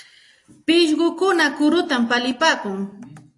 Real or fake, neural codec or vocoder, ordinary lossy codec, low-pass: real; none; AAC, 48 kbps; 10.8 kHz